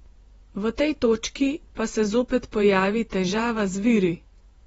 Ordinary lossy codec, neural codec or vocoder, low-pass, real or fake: AAC, 24 kbps; vocoder, 48 kHz, 128 mel bands, Vocos; 19.8 kHz; fake